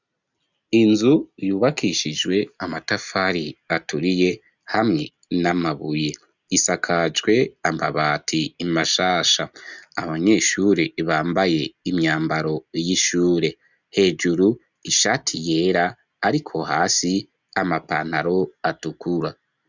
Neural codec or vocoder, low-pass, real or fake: none; 7.2 kHz; real